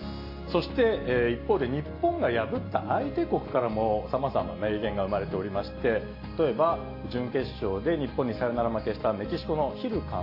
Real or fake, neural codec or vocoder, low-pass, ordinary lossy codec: real; none; 5.4 kHz; AAC, 32 kbps